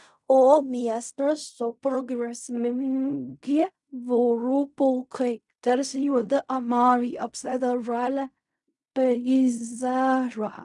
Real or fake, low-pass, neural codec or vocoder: fake; 10.8 kHz; codec, 16 kHz in and 24 kHz out, 0.4 kbps, LongCat-Audio-Codec, fine tuned four codebook decoder